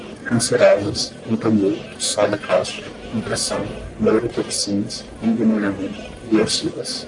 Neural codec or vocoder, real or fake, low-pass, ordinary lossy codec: codec, 44.1 kHz, 1.7 kbps, Pupu-Codec; fake; 10.8 kHz; MP3, 64 kbps